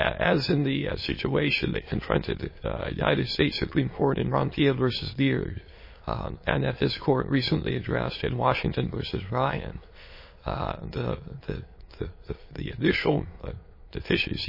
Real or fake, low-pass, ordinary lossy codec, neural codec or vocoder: fake; 5.4 kHz; MP3, 24 kbps; autoencoder, 22.05 kHz, a latent of 192 numbers a frame, VITS, trained on many speakers